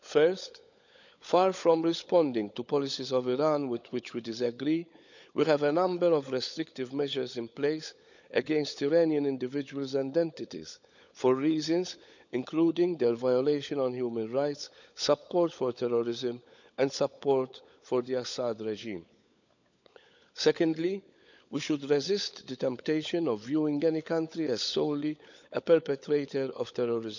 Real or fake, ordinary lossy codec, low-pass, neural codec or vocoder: fake; none; 7.2 kHz; codec, 16 kHz, 16 kbps, FunCodec, trained on LibriTTS, 50 frames a second